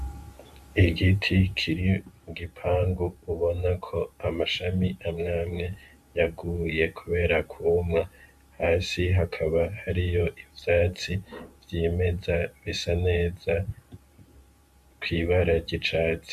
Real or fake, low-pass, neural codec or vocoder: fake; 14.4 kHz; vocoder, 44.1 kHz, 128 mel bands every 512 samples, BigVGAN v2